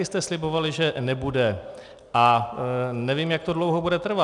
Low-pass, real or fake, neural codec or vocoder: 10.8 kHz; real; none